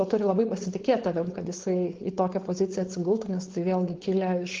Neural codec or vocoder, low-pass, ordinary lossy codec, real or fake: codec, 16 kHz, 4.8 kbps, FACodec; 7.2 kHz; Opus, 16 kbps; fake